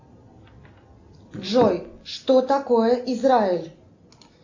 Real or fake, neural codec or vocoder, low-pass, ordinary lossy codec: real; none; 7.2 kHz; AAC, 48 kbps